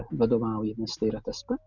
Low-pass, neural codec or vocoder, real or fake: 7.2 kHz; none; real